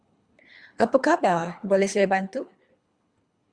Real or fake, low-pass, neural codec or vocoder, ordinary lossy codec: fake; 9.9 kHz; codec, 24 kHz, 3 kbps, HILCodec; Opus, 64 kbps